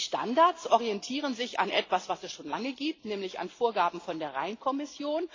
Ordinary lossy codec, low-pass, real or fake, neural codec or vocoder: AAC, 32 kbps; 7.2 kHz; real; none